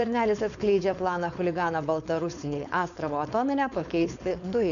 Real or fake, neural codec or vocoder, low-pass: fake; codec, 16 kHz, 4.8 kbps, FACodec; 7.2 kHz